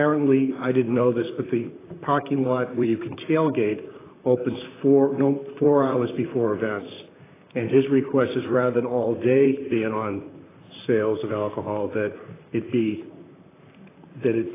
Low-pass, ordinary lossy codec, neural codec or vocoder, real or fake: 3.6 kHz; AAC, 16 kbps; vocoder, 44.1 kHz, 128 mel bands, Pupu-Vocoder; fake